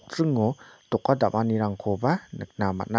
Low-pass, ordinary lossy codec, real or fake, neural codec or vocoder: none; none; real; none